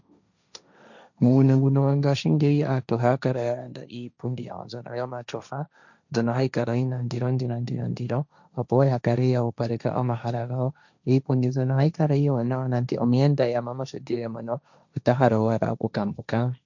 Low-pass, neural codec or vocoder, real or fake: 7.2 kHz; codec, 16 kHz, 1.1 kbps, Voila-Tokenizer; fake